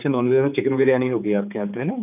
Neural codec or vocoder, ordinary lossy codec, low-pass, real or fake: codec, 16 kHz, 4 kbps, X-Codec, HuBERT features, trained on general audio; none; 3.6 kHz; fake